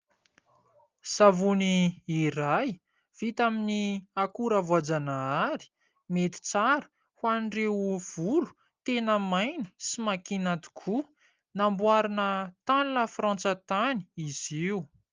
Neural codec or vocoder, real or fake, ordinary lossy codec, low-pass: none; real; Opus, 32 kbps; 7.2 kHz